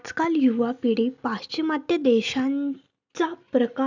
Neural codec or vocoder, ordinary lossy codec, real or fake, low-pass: none; none; real; 7.2 kHz